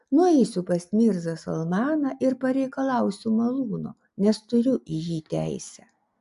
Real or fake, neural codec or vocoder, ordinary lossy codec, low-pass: real; none; AAC, 96 kbps; 10.8 kHz